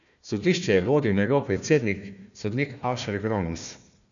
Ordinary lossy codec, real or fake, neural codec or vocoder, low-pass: none; fake; codec, 16 kHz, 1 kbps, FunCodec, trained on Chinese and English, 50 frames a second; 7.2 kHz